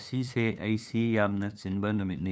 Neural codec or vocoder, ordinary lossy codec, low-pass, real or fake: codec, 16 kHz, 2 kbps, FunCodec, trained on LibriTTS, 25 frames a second; none; none; fake